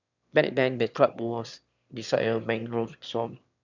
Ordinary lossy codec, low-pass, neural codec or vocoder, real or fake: none; 7.2 kHz; autoencoder, 22.05 kHz, a latent of 192 numbers a frame, VITS, trained on one speaker; fake